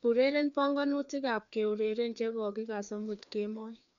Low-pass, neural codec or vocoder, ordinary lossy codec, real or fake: 7.2 kHz; codec, 16 kHz, 2 kbps, FreqCodec, larger model; none; fake